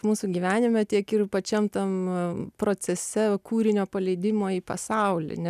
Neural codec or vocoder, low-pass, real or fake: none; 14.4 kHz; real